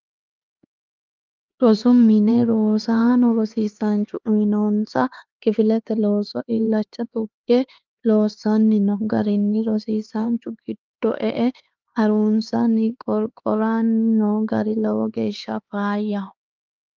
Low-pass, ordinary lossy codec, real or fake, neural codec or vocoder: 7.2 kHz; Opus, 24 kbps; fake; codec, 16 kHz, 4 kbps, X-Codec, HuBERT features, trained on LibriSpeech